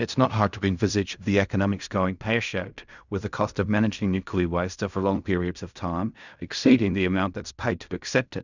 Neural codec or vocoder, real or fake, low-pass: codec, 16 kHz in and 24 kHz out, 0.4 kbps, LongCat-Audio-Codec, fine tuned four codebook decoder; fake; 7.2 kHz